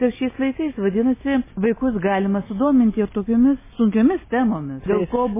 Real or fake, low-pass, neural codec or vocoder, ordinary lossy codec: real; 3.6 kHz; none; MP3, 16 kbps